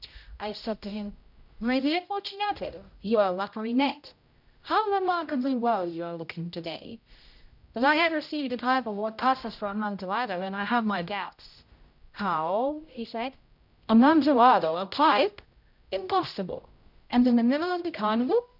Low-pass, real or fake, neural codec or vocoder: 5.4 kHz; fake; codec, 16 kHz, 0.5 kbps, X-Codec, HuBERT features, trained on general audio